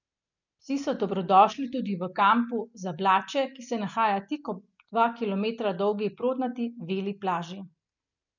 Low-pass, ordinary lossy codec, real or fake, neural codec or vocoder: 7.2 kHz; none; real; none